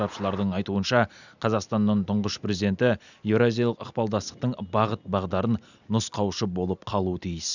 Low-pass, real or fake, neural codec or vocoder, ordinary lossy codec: 7.2 kHz; real; none; none